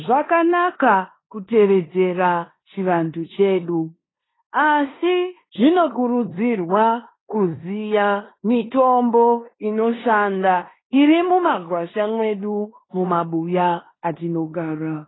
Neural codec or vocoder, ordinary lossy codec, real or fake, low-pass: codec, 16 kHz in and 24 kHz out, 0.9 kbps, LongCat-Audio-Codec, four codebook decoder; AAC, 16 kbps; fake; 7.2 kHz